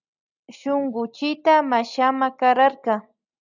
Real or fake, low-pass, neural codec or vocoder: real; 7.2 kHz; none